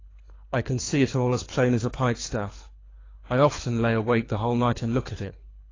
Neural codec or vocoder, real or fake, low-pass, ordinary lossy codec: codec, 24 kHz, 3 kbps, HILCodec; fake; 7.2 kHz; AAC, 32 kbps